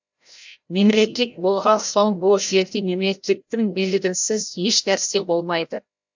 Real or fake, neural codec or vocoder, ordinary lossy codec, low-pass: fake; codec, 16 kHz, 0.5 kbps, FreqCodec, larger model; MP3, 64 kbps; 7.2 kHz